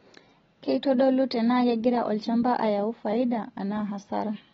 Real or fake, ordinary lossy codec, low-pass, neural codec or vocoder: real; AAC, 24 kbps; 7.2 kHz; none